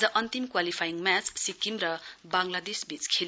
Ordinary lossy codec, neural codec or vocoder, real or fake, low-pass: none; none; real; none